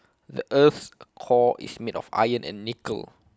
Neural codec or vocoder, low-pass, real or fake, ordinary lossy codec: none; none; real; none